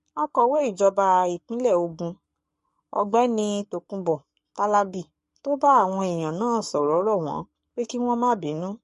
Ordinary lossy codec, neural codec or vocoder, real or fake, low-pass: MP3, 48 kbps; codec, 44.1 kHz, 7.8 kbps, Pupu-Codec; fake; 14.4 kHz